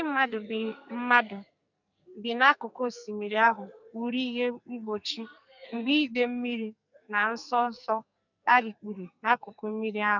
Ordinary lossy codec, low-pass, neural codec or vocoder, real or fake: none; 7.2 kHz; codec, 44.1 kHz, 2.6 kbps, SNAC; fake